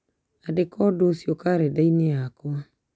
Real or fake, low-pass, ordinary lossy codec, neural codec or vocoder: real; none; none; none